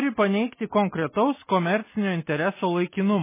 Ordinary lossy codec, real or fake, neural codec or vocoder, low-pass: MP3, 16 kbps; real; none; 3.6 kHz